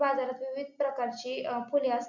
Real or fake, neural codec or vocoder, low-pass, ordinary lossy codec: real; none; 7.2 kHz; none